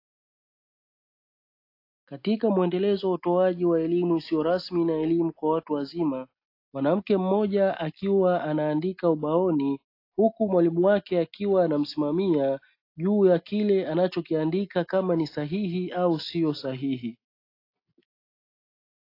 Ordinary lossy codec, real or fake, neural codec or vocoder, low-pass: AAC, 32 kbps; real; none; 5.4 kHz